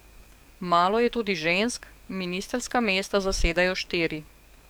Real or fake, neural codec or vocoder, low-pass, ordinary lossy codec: fake; codec, 44.1 kHz, 7.8 kbps, DAC; none; none